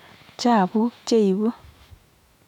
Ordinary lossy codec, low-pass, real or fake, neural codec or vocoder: none; 19.8 kHz; fake; autoencoder, 48 kHz, 128 numbers a frame, DAC-VAE, trained on Japanese speech